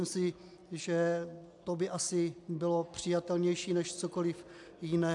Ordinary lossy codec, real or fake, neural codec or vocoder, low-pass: AAC, 64 kbps; real; none; 10.8 kHz